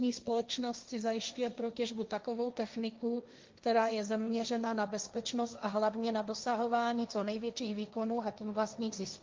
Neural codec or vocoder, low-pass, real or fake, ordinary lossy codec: codec, 16 kHz, 1.1 kbps, Voila-Tokenizer; 7.2 kHz; fake; Opus, 24 kbps